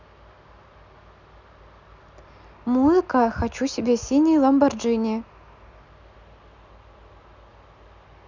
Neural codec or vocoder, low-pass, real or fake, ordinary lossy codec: none; 7.2 kHz; real; none